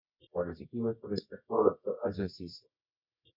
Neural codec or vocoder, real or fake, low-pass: codec, 24 kHz, 0.9 kbps, WavTokenizer, medium music audio release; fake; 5.4 kHz